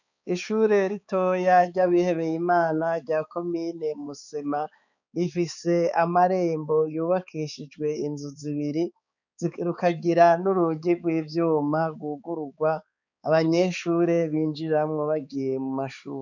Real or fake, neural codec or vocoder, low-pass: fake; codec, 16 kHz, 4 kbps, X-Codec, HuBERT features, trained on balanced general audio; 7.2 kHz